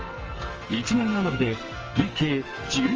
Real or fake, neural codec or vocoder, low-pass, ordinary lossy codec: fake; codec, 44.1 kHz, 2.6 kbps, SNAC; 7.2 kHz; Opus, 24 kbps